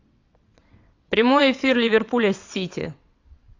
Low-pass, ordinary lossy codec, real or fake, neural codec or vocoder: 7.2 kHz; AAC, 48 kbps; fake; vocoder, 22.05 kHz, 80 mel bands, WaveNeXt